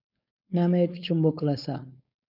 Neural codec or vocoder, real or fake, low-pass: codec, 16 kHz, 4.8 kbps, FACodec; fake; 5.4 kHz